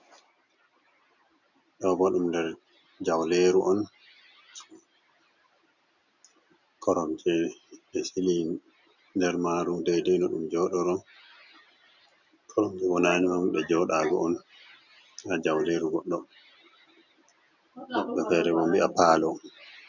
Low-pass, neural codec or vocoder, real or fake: 7.2 kHz; none; real